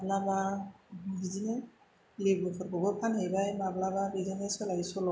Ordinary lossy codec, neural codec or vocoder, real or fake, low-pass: none; none; real; none